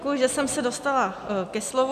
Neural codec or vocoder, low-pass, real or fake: none; 14.4 kHz; real